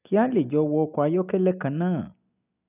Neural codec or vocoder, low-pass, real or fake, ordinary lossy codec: none; 3.6 kHz; real; none